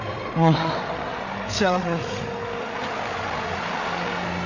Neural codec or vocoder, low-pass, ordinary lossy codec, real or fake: codec, 16 kHz, 8 kbps, FreqCodec, larger model; 7.2 kHz; none; fake